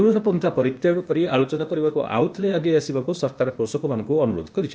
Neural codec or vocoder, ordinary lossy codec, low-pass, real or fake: codec, 16 kHz, 0.8 kbps, ZipCodec; none; none; fake